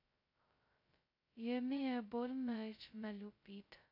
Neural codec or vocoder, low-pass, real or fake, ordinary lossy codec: codec, 16 kHz, 0.2 kbps, FocalCodec; 5.4 kHz; fake; AAC, 48 kbps